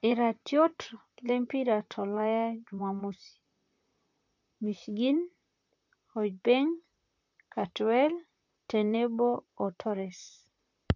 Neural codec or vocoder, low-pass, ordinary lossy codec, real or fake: vocoder, 44.1 kHz, 128 mel bands, Pupu-Vocoder; 7.2 kHz; MP3, 64 kbps; fake